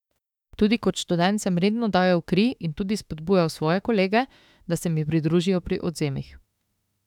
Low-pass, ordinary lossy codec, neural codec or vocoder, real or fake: 19.8 kHz; none; autoencoder, 48 kHz, 32 numbers a frame, DAC-VAE, trained on Japanese speech; fake